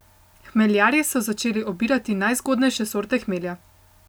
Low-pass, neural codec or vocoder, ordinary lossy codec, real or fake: none; none; none; real